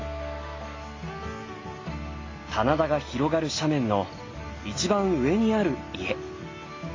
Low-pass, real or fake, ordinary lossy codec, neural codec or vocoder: 7.2 kHz; real; AAC, 32 kbps; none